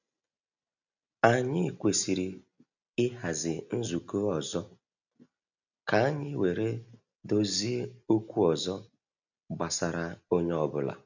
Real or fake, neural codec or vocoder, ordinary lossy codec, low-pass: real; none; none; 7.2 kHz